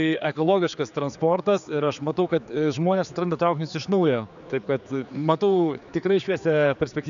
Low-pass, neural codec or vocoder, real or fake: 7.2 kHz; codec, 16 kHz, 4 kbps, X-Codec, HuBERT features, trained on general audio; fake